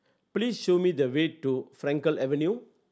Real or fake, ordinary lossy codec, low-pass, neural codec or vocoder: real; none; none; none